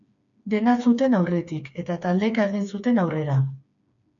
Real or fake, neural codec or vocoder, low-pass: fake; codec, 16 kHz, 4 kbps, FreqCodec, smaller model; 7.2 kHz